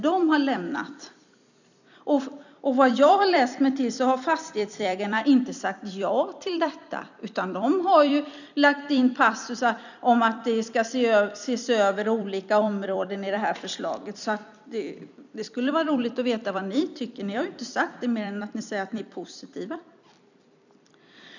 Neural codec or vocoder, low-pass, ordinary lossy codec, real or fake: vocoder, 44.1 kHz, 128 mel bands every 256 samples, BigVGAN v2; 7.2 kHz; none; fake